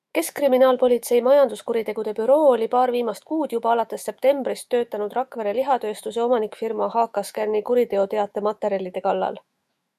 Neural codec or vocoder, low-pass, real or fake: autoencoder, 48 kHz, 128 numbers a frame, DAC-VAE, trained on Japanese speech; 14.4 kHz; fake